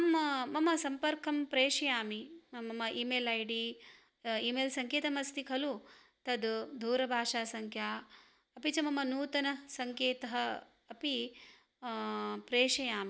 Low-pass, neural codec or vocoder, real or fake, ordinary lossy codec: none; none; real; none